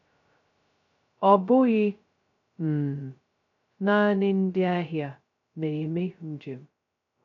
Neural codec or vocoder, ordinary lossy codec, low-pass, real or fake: codec, 16 kHz, 0.2 kbps, FocalCodec; AAC, 48 kbps; 7.2 kHz; fake